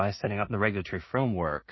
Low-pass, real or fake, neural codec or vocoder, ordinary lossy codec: 7.2 kHz; fake; codec, 16 kHz in and 24 kHz out, 0.4 kbps, LongCat-Audio-Codec, two codebook decoder; MP3, 24 kbps